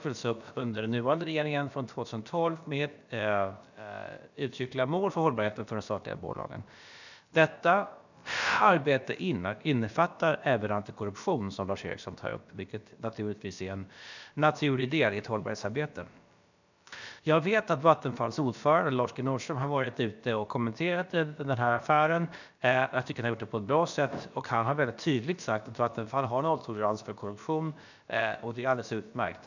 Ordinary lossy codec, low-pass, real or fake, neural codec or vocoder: none; 7.2 kHz; fake; codec, 16 kHz, about 1 kbps, DyCAST, with the encoder's durations